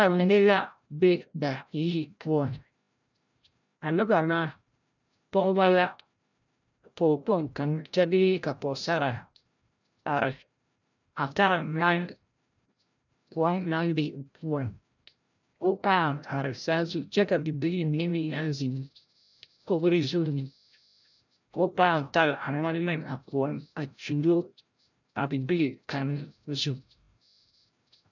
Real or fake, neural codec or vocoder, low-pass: fake; codec, 16 kHz, 0.5 kbps, FreqCodec, larger model; 7.2 kHz